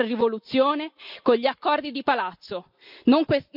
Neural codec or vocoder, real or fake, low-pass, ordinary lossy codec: none; real; 5.4 kHz; none